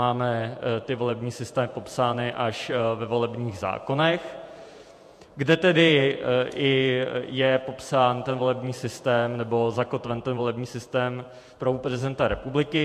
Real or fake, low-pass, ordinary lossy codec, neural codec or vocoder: fake; 14.4 kHz; MP3, 64 kbps; vocoder, 48 kHz, 128 mel bands, Vocos